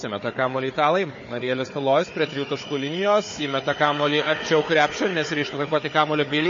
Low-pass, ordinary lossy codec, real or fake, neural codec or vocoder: 7.2 kHz; MP3, 32 kbps; fake; codec, 16 kHz, 4 kbps, FunCodec, trained on Chinese and English, 50 frames a second